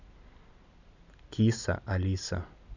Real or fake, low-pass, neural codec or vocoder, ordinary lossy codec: real; 7.2 kHz; none; none